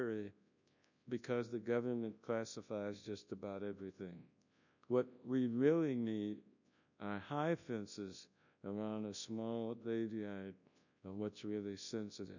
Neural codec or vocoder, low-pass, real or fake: codec, 24 kHz, 0.9 kbps, WavTokenizer, large speech release; 7.2 kHz; fake